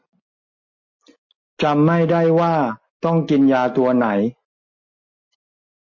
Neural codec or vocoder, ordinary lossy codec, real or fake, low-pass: none; MP3, 32 kbps; real; 7.2 kHz